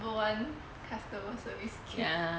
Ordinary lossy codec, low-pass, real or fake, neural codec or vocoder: none; none; real; none